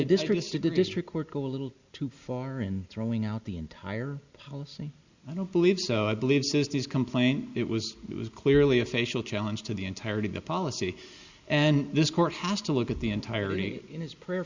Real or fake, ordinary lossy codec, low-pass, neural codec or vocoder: real; Opus, 64 kbps; 7.2 kHz; none